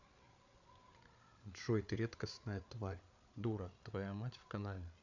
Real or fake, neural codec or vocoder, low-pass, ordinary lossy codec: fake; codec, 16 kHz, 8 kbps, FreqCodec, larger model; 7.2 kHz; none